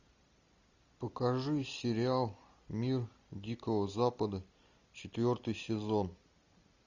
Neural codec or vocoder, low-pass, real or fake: none; 7.2 kHz; real